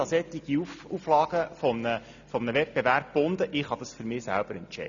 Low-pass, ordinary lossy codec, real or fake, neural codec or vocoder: 7.2 kHz; none; real; none